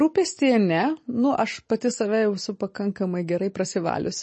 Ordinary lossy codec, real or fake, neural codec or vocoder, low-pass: MP3, 32 kbps; real; none; 10.8 kHz